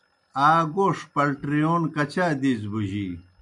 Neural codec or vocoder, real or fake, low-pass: none; real; 10.8 kHz